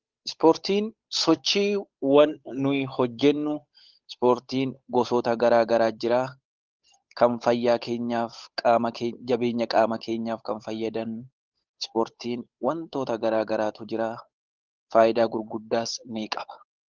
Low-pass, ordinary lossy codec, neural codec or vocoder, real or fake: 7.2 kHz; Opus, 32 kbps; codec, 16 kHz, 8 kbps, FunCodec, trained on Chinese and English, 25 frames a second; fake